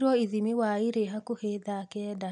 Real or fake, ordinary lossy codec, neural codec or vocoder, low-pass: real; none; none; 10.8 kHz